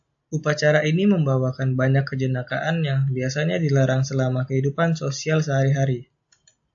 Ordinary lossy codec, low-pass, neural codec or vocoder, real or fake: AAC, 64 kbps; 7.2 kHz; none; real